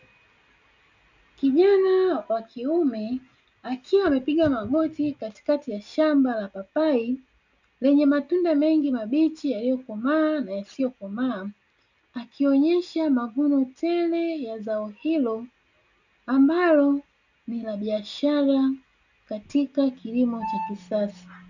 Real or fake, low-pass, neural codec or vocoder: real; 7.2 kHz; none